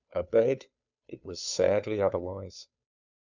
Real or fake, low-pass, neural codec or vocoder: fake; 7.2 kHz; codec, 16 kHz, 2 kbps, FunCodec, trained on Chinese and English, 25 frames a second